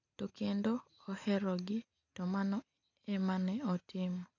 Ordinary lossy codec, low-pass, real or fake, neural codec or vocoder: none; 7.2 kHz; real; none